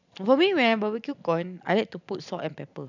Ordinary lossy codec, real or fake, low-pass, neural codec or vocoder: none; real; 7.2 kHz; none